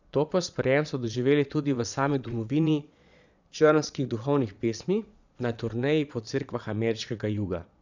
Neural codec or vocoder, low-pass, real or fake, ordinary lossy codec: vocoder, 22.05 kHz, 80 mel bands, Vocos; 7.2 kHz; fake; none